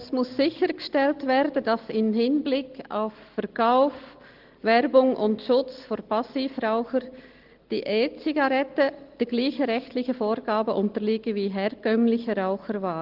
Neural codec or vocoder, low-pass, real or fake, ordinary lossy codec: none; 5.4 kHz; real; Opus, 16 kbps